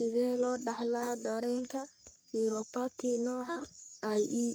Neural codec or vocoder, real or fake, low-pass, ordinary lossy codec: codec, 44.1 kHz, 3.4 kbps, Pupu-Codec; fake; none; none